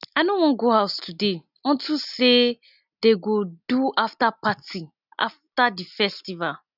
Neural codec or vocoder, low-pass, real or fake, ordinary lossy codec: none; 5.4 kHz; real; none